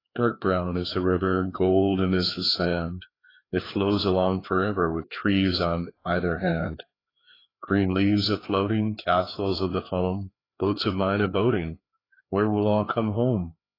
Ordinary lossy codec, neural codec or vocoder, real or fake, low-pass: AAC, 24 kbps; codec, 16 kHz, 2 kbps, FreqCodec, larger model; fake; 5.4 kHz